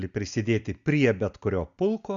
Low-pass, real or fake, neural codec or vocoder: 7.2 kHz; real; none